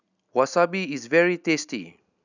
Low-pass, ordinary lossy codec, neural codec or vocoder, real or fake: 7.2 kHz; none; none; real